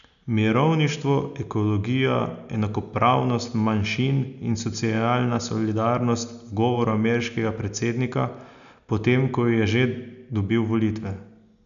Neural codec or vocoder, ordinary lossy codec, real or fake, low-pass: none; none; real; 7.2 kHz